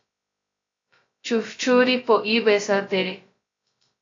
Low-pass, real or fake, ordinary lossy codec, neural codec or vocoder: 7.2 kHz; fake; AAC, 48 kbps; codec, 16 kHz, 0.2 kbps, FocalCodec